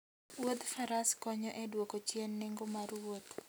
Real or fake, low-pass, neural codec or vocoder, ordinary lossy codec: real; none; none; none